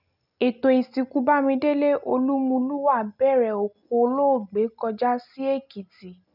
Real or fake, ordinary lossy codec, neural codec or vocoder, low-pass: real; none; none; 5.4 kHz